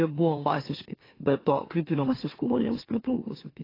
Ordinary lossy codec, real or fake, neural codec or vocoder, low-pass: AAC, 24 kbps; fake; autoencoder, 44.1 kHz, a latent of 192 numbers a frame, MeloTTS; 5.4 kHz